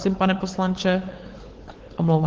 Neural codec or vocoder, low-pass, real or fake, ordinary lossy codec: codec, 16 kHz, 8 kbps, FunCodec, trained on LibriTTS, 25 frames a second; 7.2 kHz; fake; Opus, 16 kbps